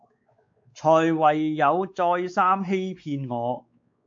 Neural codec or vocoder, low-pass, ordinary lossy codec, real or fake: codec, 16 kHz, 4 kbps, X-Codec, WavLM features, trained on Multilingual LibriSpeech; 7.2 kHz; MP3, 48 kbps; fake